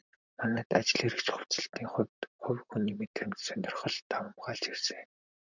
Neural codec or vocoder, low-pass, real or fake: vocoder, 44.1 kHz, 128 mel bands, Pupu-Vocoder; 7.2 kHz; fake